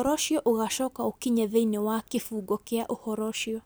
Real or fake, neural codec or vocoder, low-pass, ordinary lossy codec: real; none; none; none